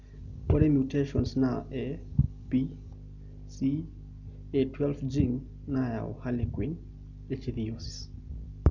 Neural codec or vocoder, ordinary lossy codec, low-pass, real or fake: none; none; 7.2 kHz; real